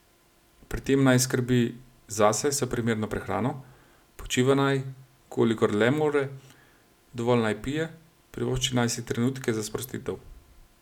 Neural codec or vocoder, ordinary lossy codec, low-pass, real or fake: none; none; 19.8 kHz; real